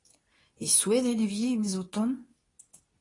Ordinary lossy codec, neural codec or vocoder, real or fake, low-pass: AAC, 32 kbps; codec, 24 kHz, 0.9 kbps, WavTokenizer, medium speech release version 2; fake; 10.8 kHz